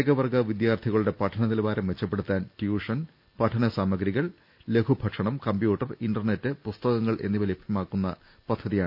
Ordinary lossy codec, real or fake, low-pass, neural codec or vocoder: none; real; 5.4 kHz; none